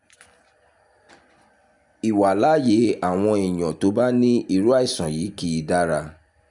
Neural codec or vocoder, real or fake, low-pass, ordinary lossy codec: none; real; 10.8 kHz; none